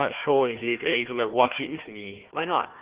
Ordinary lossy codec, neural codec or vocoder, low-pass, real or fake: Opus, 16 kbps; codec, 16 kHz, 1 kbps, FunCodec, trained on Chinese and English, 50 frames a second; 3.6 kHz; fake